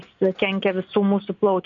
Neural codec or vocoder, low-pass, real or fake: none; 7.2 kHz; real